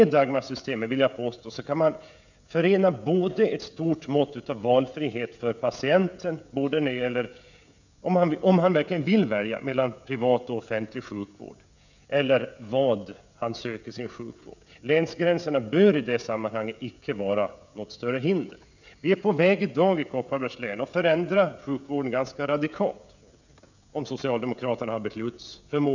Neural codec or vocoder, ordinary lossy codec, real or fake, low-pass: codec, 16 kHz, 16 kbps, FreqCodec, smaller model; none; fake; 7.2 kHz